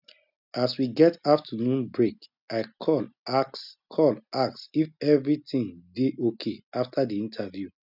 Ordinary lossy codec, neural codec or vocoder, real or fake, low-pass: none; none; real; 5.4 kHz